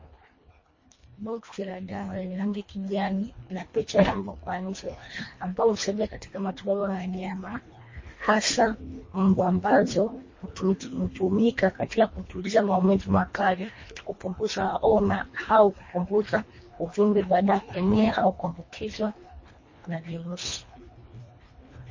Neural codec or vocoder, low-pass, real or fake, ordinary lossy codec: codec, 24 kHz, 1.5 kbps, HILCodec; 7.2 kHz; fake; MP3, 32 kbps